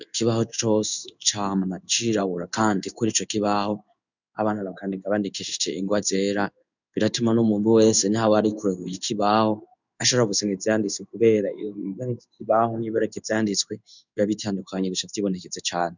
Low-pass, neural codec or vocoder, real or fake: 7.2 kHz; codec, 16 kHz in and 24 kHz out, 1 kbps, XY-Tokenizer; fake